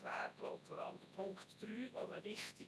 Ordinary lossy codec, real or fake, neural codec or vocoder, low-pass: none; fake; codec, 24 kHz, 0.9 kbps, WavTokenizer, large speech release; none